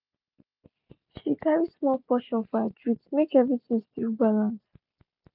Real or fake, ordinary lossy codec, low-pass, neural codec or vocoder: real; none; 5.4 kHz; none